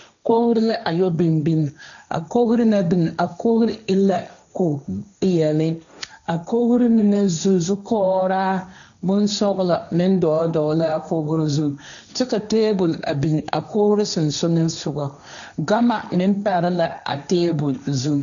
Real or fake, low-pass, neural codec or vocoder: fake; 7.2 kHz; codec, 16 kHz, 1.1 kbps, Voila-Tokenizer